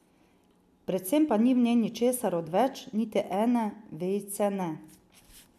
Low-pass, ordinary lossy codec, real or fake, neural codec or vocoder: 14.4 kHz; MP3, 96 kbps; real; none